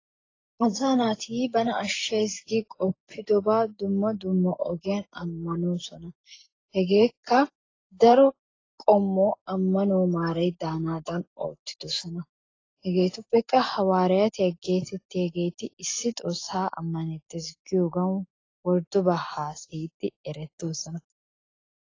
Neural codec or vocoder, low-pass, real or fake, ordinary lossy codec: none; 7.2 kHz; real; AAC, 32 kbps